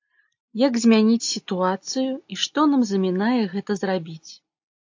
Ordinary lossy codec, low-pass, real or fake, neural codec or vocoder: AAC, 48 kbps; 7.2 kHz; real; none